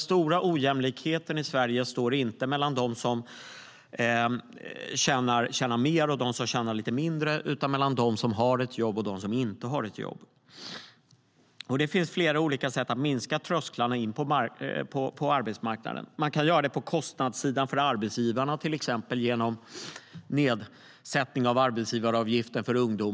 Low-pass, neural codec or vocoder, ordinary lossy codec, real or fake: none; none; none; real